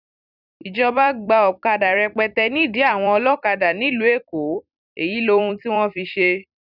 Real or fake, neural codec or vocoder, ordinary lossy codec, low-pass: fake; vocoder, 44.1 kHz, 128 mel bands every 256 samples, BigVGAN v2; none; 5.4 kHz